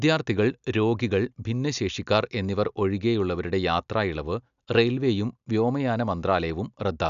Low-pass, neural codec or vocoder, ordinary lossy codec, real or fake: 7.2 kHz; none; none; real